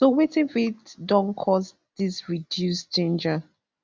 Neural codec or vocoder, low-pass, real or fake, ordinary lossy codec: none; none; real; none